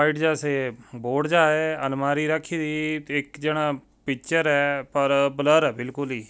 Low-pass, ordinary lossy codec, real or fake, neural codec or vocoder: none; none; real; none